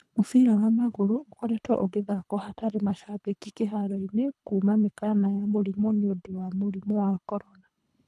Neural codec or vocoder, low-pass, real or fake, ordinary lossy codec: codec, 24 kHz, 3 kbps, HILCodec; none; fake; none